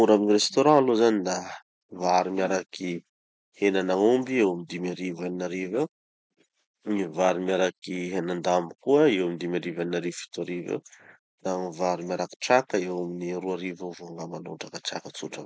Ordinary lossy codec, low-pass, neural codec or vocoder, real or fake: none; none; none; real